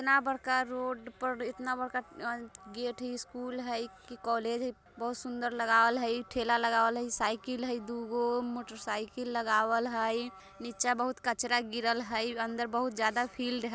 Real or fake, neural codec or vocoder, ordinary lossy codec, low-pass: real; none; none; none